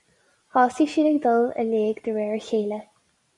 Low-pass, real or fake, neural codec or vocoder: 10.8 kHz; real; none